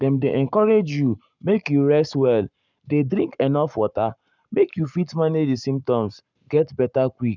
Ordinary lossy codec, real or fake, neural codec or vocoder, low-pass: none; fake; codec, 44.1 kHz, 7.8 kbps, Pupu-Codec; 7.2 kHz